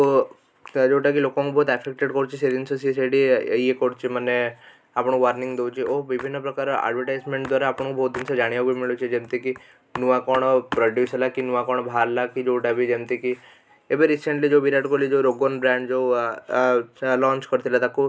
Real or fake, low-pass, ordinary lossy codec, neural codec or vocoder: real; none; none; none